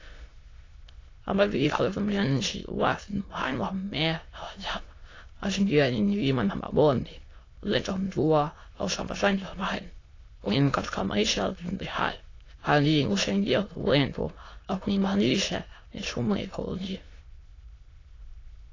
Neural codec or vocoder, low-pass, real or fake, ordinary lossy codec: autoencoder, 22.05 kHz, a latent of 192 numbers a frame, VITS, trained on many speakers; 7.2 kHz; fake; AAC, 32 kbps